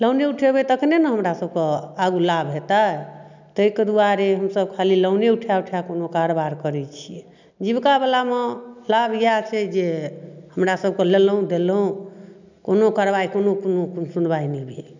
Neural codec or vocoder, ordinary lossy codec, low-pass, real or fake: none; none; 7.2 kHz; real